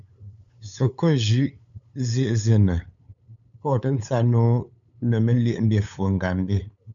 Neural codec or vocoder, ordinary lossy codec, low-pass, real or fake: codec, 16 kHz, 8 kbps, FunCodec, trained on LibriTTS, 25 frames a second; MP3, 96 kbps; 7.2 kHz; fake